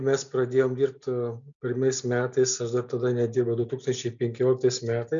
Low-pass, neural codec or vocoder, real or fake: 7.2 kHz; none; real